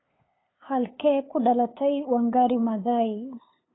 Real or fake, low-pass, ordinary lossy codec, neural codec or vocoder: fake; 7.2 kHz; AAC, 16 kbps; codec, 16 kHz, 8 kbps, FunCodec, trained on LibriTTS, 25 frames a second